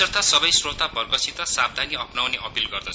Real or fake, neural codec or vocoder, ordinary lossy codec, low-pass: real; none; none; none